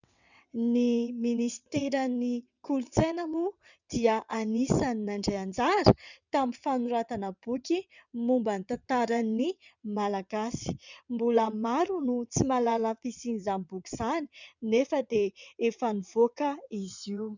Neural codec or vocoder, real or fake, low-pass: vocoder, 22.05 kHz, 80 mel bands, WaveNeXt; fake; 7.2 kHz